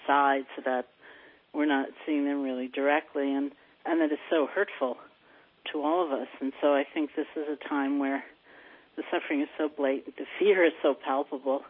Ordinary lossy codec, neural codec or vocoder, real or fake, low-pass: MP3, 24 kbps; none; real; 5.4 kHz